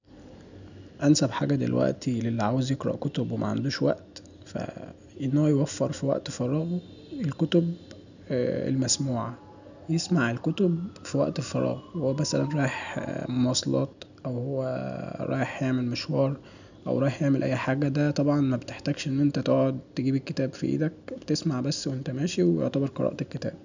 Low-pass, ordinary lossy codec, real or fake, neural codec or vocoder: 7.2 kHz; none; real; none